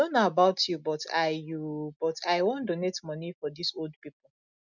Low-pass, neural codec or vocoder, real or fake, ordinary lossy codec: 7.2 kHz; none; real; none